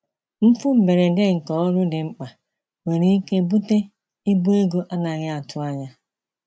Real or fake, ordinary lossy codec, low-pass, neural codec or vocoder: real; none; none; none